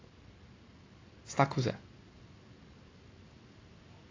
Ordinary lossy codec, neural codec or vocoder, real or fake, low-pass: AAC, 32 kbps; none; real; 7.2 kHz